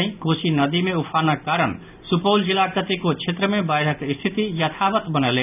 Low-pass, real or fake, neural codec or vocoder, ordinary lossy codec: 3.6 kHz; real; none; none